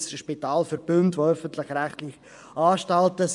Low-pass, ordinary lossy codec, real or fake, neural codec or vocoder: 10.8 kHz; none; fake; vocoder, 24 kHz, 100 mel bands, Vocos